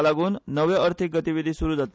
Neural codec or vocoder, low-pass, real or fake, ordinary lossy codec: none; none; real; none